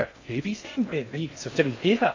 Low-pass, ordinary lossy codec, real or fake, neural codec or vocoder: 7.2 kHz; AAC, 48 kbps; fake; codec, 16 kHz in and 24 kHz out, 0.6 kbps, FocalCodec, streaming, 2048 codes